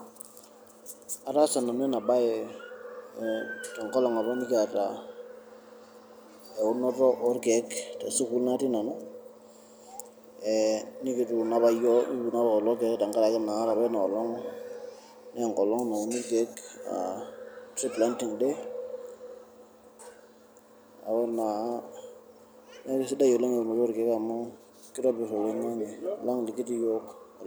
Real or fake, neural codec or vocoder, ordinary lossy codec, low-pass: real; none; none; none